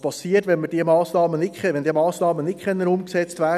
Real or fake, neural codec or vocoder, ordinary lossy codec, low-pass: real; none; none; 14.4 kHz